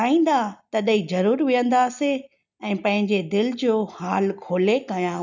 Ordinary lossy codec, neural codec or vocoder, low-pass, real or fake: none; none; 7.2 kHz; real